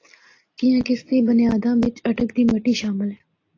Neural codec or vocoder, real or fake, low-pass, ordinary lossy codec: none; real; 7.2 kHz; AAC, 32 kbps